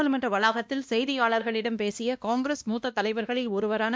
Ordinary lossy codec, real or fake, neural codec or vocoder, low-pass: none; fake; codec, 16 kHz, 1 kbps, X-Codec, WavLM features, trained on Multilingual LibriSpeech; none